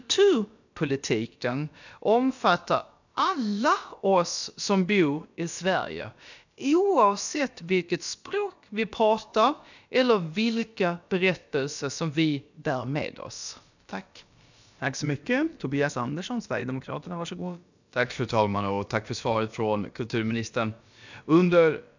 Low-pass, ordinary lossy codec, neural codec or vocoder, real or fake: 7.2 kHz; none; codec, 16 kHz, about 1 kbps, DyCAST, with the encoder's durations; fake